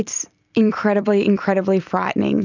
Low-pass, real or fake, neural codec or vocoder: 7.2 kHz; fake; vocoder, 44.1 kHz, 128 mel bands every 256 samples, BigVGAN v2